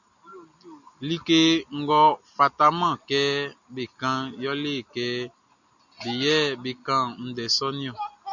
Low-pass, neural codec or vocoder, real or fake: 7.2 kHz; none; real